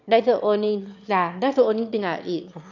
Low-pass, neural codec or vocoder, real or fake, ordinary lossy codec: 7.2 kHz; autoencoder, 22.05 kHz, a latent of 192 numbers a frame, VITS, trained on one speaker; fake; none